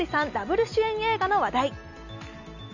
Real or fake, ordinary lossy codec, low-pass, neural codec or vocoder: real; none; 7.2 kHz; none